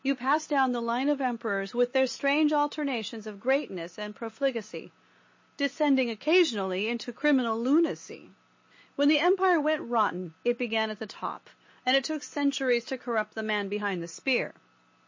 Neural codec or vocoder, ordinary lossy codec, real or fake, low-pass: none; MP3, 32 kbps; real; 7.2 kHz